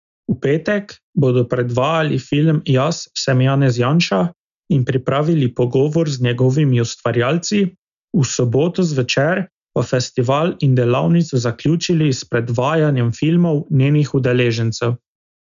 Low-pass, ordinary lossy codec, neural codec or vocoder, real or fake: 7.2 kHz; none; none; real